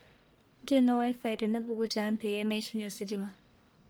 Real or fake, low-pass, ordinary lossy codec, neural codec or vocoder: fake; none; none; codec, 44.1 kHz, 1.7 kbps, Pupu-Codec